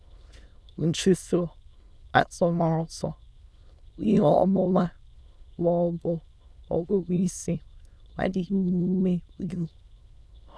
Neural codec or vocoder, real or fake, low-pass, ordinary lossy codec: autoencoder, 22.05 kHz, a latent of 192 numbers a frame, VITS, trained on many speakers; fake; none; none